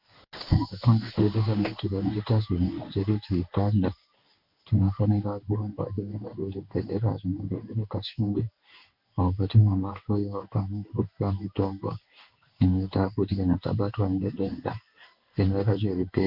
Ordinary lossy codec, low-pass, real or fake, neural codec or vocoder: Opus, 64 kbps; 5.4 kHz; fake; codec, 16 kHz in and 24 kHz out, 1 kbps, XY-Tokenizer